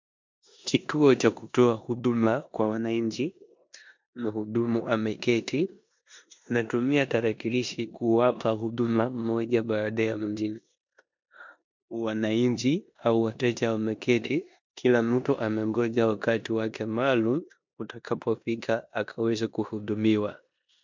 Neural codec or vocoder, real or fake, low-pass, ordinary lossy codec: codec, 16 kHz in and 24 kHz out, 0.9 kbps, LongCat-Audio-Codec, four codebook decoder; fake; 7.2 kHz; MP3, 64 kbps